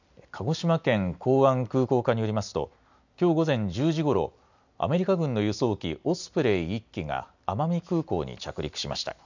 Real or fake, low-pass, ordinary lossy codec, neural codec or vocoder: real; 7.2 kHz; none; none